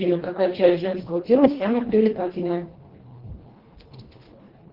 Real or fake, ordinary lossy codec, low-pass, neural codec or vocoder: fake; Opus, 16 kbps; 5.4 kHz; codec, 24 kHz, 1.5 kbps, HILCodec